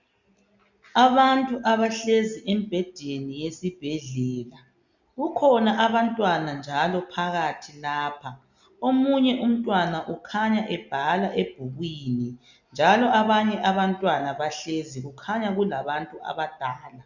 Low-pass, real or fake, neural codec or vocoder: 7.2 kHz; real; none